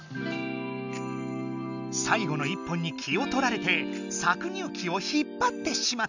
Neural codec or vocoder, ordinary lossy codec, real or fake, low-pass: none; none; real; 7.2 kHz